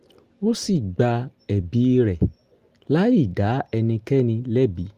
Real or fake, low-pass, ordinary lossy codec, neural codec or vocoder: real; 14.4 kHz; Opus, 32 kbps; none